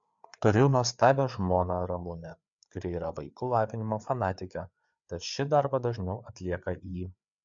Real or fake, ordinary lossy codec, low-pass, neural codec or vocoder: fake; MP3, 64 kbps; 7.2 kHz; codec, 16 kHz, 4 kbps, FreqCodec, larger model